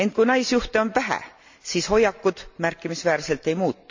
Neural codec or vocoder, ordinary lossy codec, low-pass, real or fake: none; MP3, 64 kbps; 7.2 kHz; real